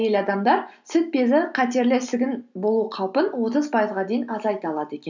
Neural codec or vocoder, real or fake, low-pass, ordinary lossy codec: none; real; 7.2 kHz; none